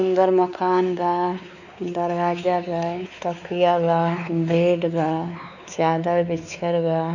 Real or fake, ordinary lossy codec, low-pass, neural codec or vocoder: fake; none; 7.2 kHz; codec, 16 kHz, 4 kbps, X-Codec, WavLM features, trained on Multilingual LibriSpeech